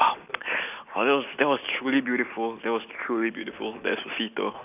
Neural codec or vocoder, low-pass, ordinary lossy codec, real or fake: none; 3.6 kHz; none; real